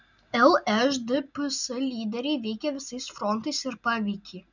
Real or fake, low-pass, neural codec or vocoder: real; 7.2 kHz; none